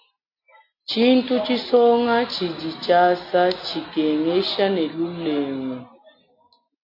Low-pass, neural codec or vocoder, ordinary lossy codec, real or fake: 5.4 kHz; none; AAC, 32 kbps; real